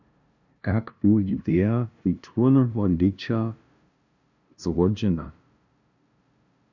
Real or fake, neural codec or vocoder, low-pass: fake; codec, 16 kHz, 0.5 kbps, FunCodec, trained on LibriTTS, 25 frames a second; 7.2 kHz